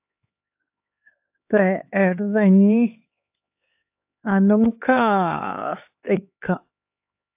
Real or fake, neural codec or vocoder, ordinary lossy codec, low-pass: fake; codec, 16 kHz, 4 kbps, X-Codec, HuBERT features, trained on LibriSpeech; AAC, 32 kbps; 3.6 kHz